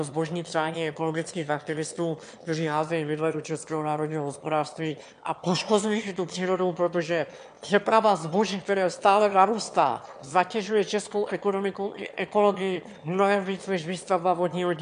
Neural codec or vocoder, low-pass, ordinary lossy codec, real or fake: autoencoder, 22.05 kHz, a latent of 192 numbers a frame, VITS, trained on one speaker; 9.9 kHz; MP3, 64 kbps; fake